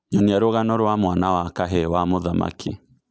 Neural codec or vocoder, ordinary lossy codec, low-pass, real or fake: none; none; none; real